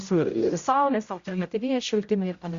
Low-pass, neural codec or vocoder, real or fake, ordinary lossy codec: 7.2 kHz; codec, 16 kHz, 0.5 kbps, X-Codec, HuBERT features, trained on general audio; fake; Opus, 64 kbps